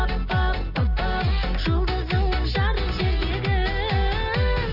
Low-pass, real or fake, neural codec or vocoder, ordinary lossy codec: 5.4 kHz; real; none; Opus, 24 kbps